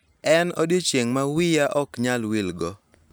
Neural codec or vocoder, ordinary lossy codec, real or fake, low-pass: none; none; real; none